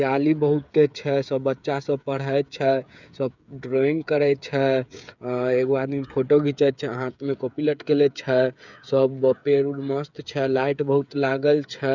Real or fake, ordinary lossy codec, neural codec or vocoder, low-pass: fake; none; codec, 16 kHz, 8 kbps, FreqCodec, smaller model; 7.2 kHz